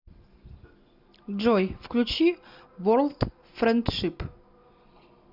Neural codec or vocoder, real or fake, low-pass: none; real; 5.4 kHz